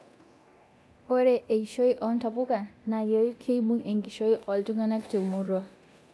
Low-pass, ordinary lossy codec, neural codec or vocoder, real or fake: none; none; codec, 24 kHz, 0.9 kbps, DualCodec; fake